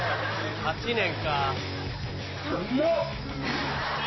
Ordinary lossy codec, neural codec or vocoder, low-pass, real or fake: MP3, 24 kbps; none; 7.2 kHz; real